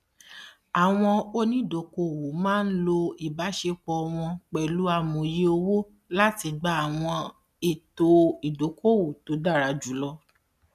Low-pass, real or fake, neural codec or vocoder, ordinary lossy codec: 14.4 kHz; real; none; none